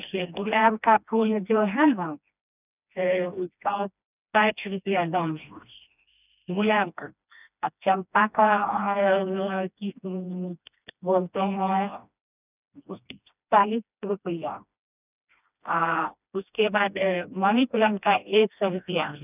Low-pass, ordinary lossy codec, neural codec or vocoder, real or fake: 3.6 kHz; none; codec, 16 kHz, 1 kbps, FreqCodec, smaller model; fake